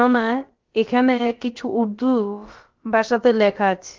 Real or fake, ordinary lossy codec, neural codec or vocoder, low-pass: fake; Opus, 16 kbps; codec, 16 kHz, about 1 kbps, DyCAST, with the encoder's durations; 7.2 kHz